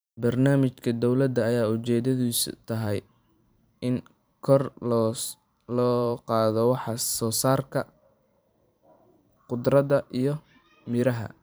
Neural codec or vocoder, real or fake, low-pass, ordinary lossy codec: vocoder, 44.1 kHz, 128 mel bands every 512 samples, BigVGAN v2; fake; none; none